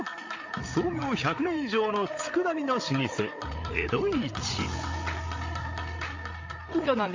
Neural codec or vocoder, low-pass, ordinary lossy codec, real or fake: codec, 16 kHz, 8 kbps, FreqCodec, larger model; 7.2 kHz; MP3, 48 kbps; fake